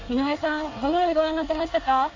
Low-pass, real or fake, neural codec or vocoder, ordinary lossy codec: 7.2 kHz; fake; codec, 24 kHz, 1 kbps, SNAC; AAC, 48 kbps